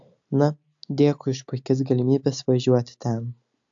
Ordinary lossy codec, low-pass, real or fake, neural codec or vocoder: AAC, 64 kbps; 7.2 kHz; real; none